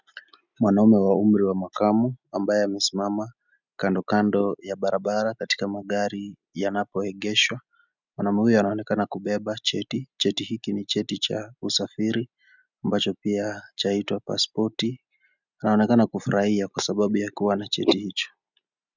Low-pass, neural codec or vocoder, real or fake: 7.2 kHz; none; real